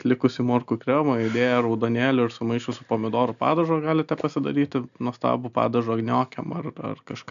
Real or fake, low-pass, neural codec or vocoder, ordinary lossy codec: real; 7.2 kHz; none; AAC, 96 kbps